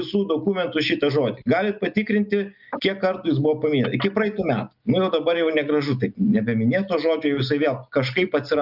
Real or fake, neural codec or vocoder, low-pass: real; none; 5.4 kHz